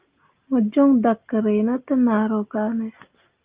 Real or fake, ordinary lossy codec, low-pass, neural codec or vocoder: real; Opus, 32 kbps; 3.6 kHz; none